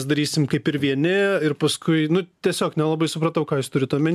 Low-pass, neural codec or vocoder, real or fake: 14.4 kHz; none; real